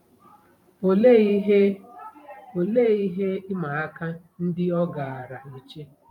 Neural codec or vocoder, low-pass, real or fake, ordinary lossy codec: vocoder, 44.1 kHz, 128 mel bands every 512 samples, BigVGAN v2; 19.8 kHz; fake; none